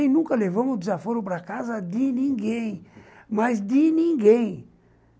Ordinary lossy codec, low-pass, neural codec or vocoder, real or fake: none; none; none; real